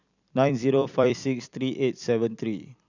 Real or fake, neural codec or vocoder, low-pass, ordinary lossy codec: fake; vocoder, 44.1 kHz, 128 mel bands every 256 samples, BigVGAN v2; 7.2 kHz; none